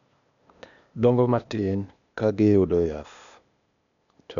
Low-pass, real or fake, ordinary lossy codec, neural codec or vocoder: 7.2 kHz; fake; none; codec, 16 kHz, 0.8 kbps, ZipCodec